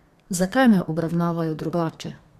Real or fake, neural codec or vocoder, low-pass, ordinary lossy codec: fake; codec, 32 kHz, 1.9 kbps, SNAC; 14.4 kHz; none